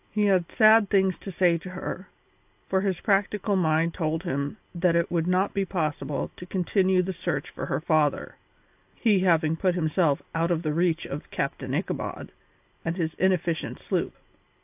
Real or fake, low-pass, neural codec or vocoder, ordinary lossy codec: real; 3.6 kHz; none; AAC, 32 kbps